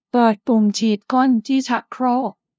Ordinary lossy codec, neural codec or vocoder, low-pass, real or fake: none; codec, 16 kHz, 0.5 kbps, FunCodec, trained on LibriTTS, 25 frames a second; none; fake